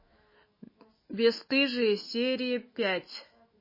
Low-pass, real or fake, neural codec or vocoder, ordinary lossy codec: 5.4 kHz; fake; autoencoder, 48 kHz, 128 numbers a frame, DAC-VAE, trained on Japanese speech; MP3, 24 kbps